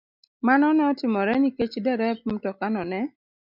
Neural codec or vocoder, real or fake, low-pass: none; real; 5.4 kHz